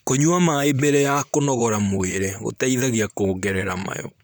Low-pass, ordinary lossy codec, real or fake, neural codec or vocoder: none; none; fake; vocoder, 44.1 kHz, 128 mel bands, Pupu-Vocoder